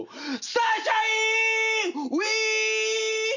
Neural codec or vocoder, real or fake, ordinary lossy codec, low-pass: none; real; none; 7.2 kHz